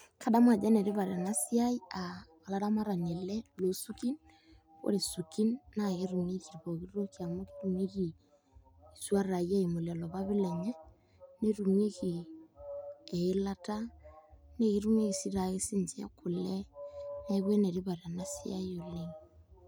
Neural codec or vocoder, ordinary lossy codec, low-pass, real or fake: none; none; none; real